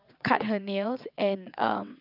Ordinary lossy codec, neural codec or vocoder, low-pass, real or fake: none; vocoder, 22.05 kHz, 80 mel bands, WaveNeXt; 5.4 kHz; fake